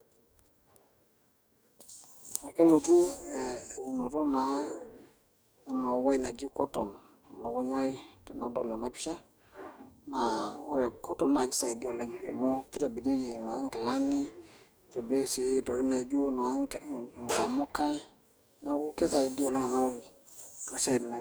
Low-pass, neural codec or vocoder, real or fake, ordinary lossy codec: none; codec, 44.1 kHz, 2.6 kbps, DAC; fake; none